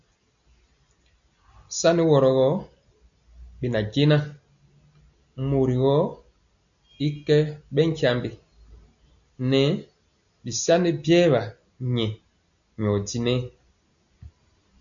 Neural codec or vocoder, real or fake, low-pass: none; real; 7.2 kHz